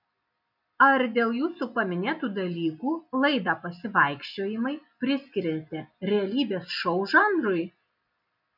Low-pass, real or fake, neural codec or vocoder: 5.4 kHz; real; none